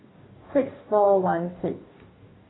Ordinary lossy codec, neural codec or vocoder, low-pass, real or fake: AAC, 16 kbps; codec, 44.1 kHz, 2.6 kbps, DAC; 7.2 kHz; fake